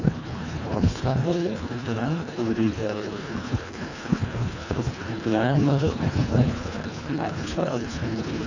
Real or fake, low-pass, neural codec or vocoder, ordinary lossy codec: fake; 7.2 kHz; codec, 24 kHz, 1.5 kbps, HILCodec; none